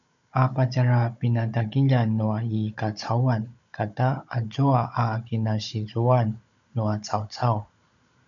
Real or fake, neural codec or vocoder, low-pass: fake; codec, 16 kHz, 16 kbps, FunCodec, trained on Chinese and English, 50 frames a second; 7.2 kHz